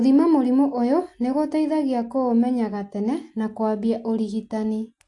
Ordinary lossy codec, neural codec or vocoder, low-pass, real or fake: AAC, 48 kbps; none; 10.8 kHz; real